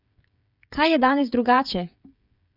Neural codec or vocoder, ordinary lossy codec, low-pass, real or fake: codec, 16 kHz, 8 kbps, FreqCodec, smaller model; none; 5.4 kHz; fake